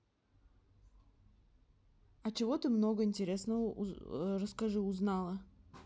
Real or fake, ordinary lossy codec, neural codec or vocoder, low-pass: real; none; none; none